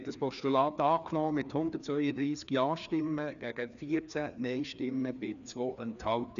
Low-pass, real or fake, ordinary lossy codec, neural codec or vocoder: 7.2 kHz; fake; none; codec, 16 kHz, 2 kbps, FreqCodec, larger model